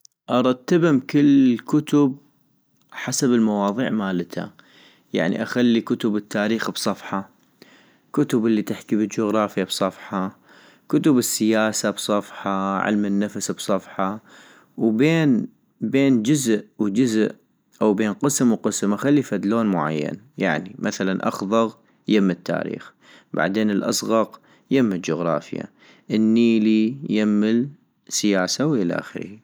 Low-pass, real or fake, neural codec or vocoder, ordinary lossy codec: none; real; none; none